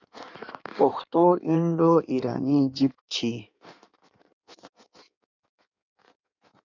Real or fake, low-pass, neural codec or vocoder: fake; 7.2 kHz; codec, 16 kHz in and 24 kHz out, 1.1 kbps, FireRedTTS-2 codec